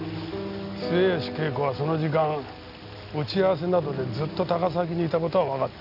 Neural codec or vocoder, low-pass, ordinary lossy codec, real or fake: none; 5.4 kHz; none; real